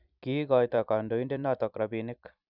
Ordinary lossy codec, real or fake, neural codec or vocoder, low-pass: none; fake; vocoder, 44.1 kHz, 128 mel bands every 256 samples, BigVGAN v2; 5.4 kHz